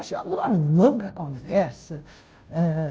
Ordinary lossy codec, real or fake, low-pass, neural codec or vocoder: none; fake; none; codec, 16 kHz, 0.5 kbps, FunCodec, trained on Chinese and English, 25 frames a second